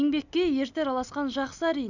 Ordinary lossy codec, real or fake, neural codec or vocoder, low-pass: none; real; none; 7.2 kHz